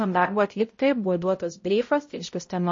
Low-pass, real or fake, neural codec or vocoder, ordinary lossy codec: 7.2 kHz; fake; codec, 16 kHz, 0.5 kbps, X-Codec, HuBERT features, trained on balanced general audio; MP3, 32 kbps